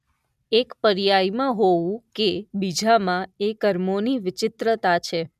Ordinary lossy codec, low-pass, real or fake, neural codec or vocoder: none; 14.4 kHz; real; none